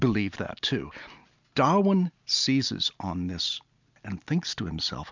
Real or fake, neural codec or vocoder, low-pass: real; none; 7.2 kHz